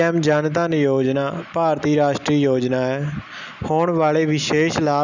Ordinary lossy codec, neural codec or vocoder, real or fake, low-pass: none; none; real; 7.2 kHz